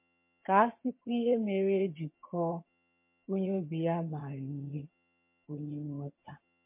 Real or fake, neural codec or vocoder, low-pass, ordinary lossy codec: fake; vocoder, 22.05 kHz, 80 mel bands, HiFi-GAN; 3.6 kHz; MP3, 24 kbps